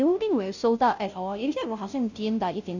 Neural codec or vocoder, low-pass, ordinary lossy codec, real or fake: codec, 16 kHz, 0.5 kbps, FunCodec, trained on Chinese and English, 25 frames a second; 7.2 kHz; none; fake